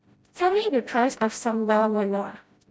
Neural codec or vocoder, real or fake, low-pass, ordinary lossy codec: codec, 16 kHz, 0.5 kbps, FreqCodec, smaller model; fake; none; none